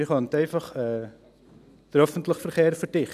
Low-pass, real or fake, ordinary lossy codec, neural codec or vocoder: 14.4 kHz; real; none; none